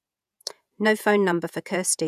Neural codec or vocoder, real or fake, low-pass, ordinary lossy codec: vocoder, 48 kHz, 128 mel bands, Vocos; fake; 14.4 kHz; none